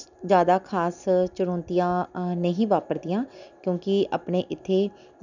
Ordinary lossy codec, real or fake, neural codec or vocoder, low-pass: none; real; none; 7.2 kHz